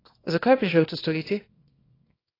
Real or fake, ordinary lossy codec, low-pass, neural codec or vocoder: fake; AAC, 24 kbps; 5.4 kHz; codec, 24 kHz, 0.9 kbps, WavTokenizer, small release